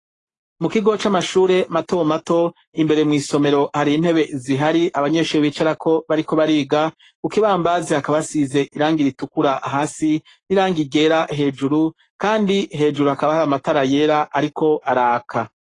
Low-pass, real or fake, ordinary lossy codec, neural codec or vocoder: 10.8 kHz; fake; AAC, 32 kbps; codec, 44.1 kHz, 7.8 kbps, Pupu-Codec